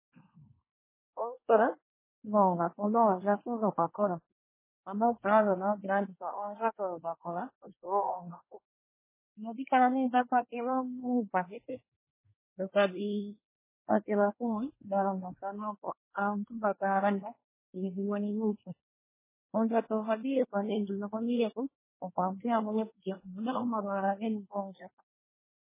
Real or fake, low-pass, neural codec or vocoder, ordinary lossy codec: fake; 3.6 kHz; codec, 24 kHz, 1 kbps, SNAC; MP3, 16 kbps